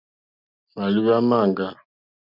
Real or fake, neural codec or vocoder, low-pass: real; none; 5.4 kHz